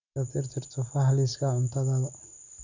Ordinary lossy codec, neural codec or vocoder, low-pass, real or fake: none; none; 7.2 kHz; real